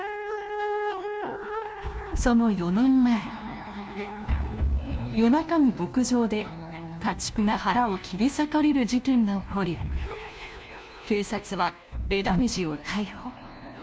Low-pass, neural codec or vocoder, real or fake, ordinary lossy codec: none; codec, 16 kHz, 1 kbps, FunCodec, trained on LibriTTS, 50 frames a second; fake; none